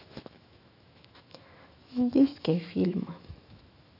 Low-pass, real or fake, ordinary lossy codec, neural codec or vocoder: 5.4 kHz; fake; none; autoencoder, 48 kHz, 128 numbers a frame, DAC-VAE, trained on Japanese speech